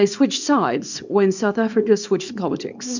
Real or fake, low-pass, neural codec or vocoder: fake; 7.2 kHz; codec, 24 kHz, 0.9 kbps, WavTokenizer, small release